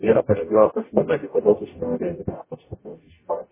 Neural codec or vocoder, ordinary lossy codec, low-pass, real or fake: codec, 44.1 kHz, 0.9 kbps, DAC; MP3, 16 kbps; 3.6 kHz; fake